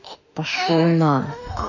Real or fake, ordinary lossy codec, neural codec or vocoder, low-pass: fake; none; autoencoder, 48 kHz, 32 numbers a frame, DAC-VAE, trained on Japanese speech; 7.2 kHz